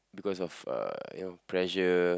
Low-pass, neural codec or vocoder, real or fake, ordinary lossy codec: none; none; real; none